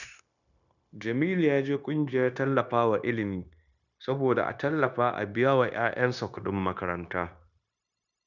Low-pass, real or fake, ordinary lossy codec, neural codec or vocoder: 7.2 kHz; fake; none; codec, 16 kHz, 0.9 kbps, LongCat-Audio-Codec